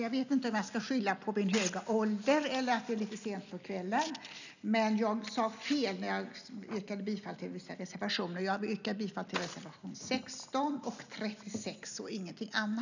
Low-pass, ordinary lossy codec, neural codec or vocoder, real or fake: 7.2 kHz; none; none; real